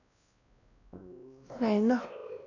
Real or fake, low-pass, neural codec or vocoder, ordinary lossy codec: fake; 7.2 kHz; codec, 16 kHz, 1 kbps, X-Codec, WavLM features, trained on Multilingual LibriSpeech; none